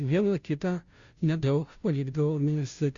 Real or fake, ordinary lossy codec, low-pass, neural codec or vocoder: fake; Opus, 64 kbps; 7.2 kHz; codec, 16 kHz, 0.5 kbps, FunCodec, trained on Chinese and English, 25 frames a second